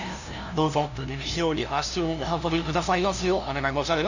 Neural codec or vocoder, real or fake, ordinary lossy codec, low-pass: codec, 16 kHz, 0.5 kbps, FunCodec, trained on LibriTTS, 25 frames a second; fake; none; 7.2 kHz